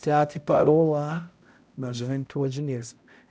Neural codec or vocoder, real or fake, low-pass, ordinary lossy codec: codec, 16 kHz, 0.5 kbps, X-Codec, HuBERT features, trained on balanced general audio; fake; none; none